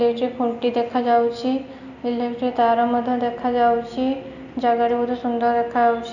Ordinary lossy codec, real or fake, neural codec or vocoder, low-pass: none; real; none; 7.2 kHz